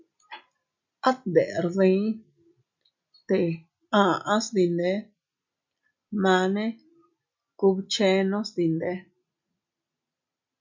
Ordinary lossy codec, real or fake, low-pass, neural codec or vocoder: MP3, 64 kbps; real; 7.2 kHz; none